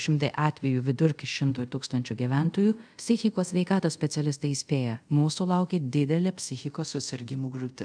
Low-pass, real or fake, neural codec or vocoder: 9.9 kHz; fake; codec, 24 kHz, 0.5 kbps, DualCodec